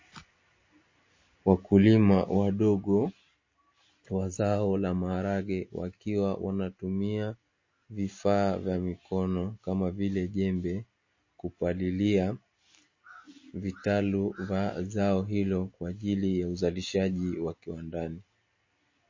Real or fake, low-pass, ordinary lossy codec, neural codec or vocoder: real; 7.2 kHz; MP3, 32 kbps; none